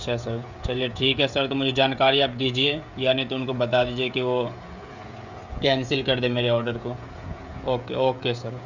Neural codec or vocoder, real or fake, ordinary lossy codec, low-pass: codec, 16 kHz, 16 kbps, FreqCodec, smaller model; fake; none; 7.2 kHz